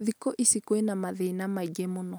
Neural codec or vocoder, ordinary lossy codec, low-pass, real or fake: none; none; none; real